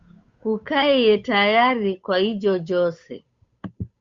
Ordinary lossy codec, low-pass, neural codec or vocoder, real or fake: Opus, 64 kbps; 7.2 kHz; codec, 16 kHz, 8 kbps, FunCodec, trained on Chinese and English, 25 frames a second; fake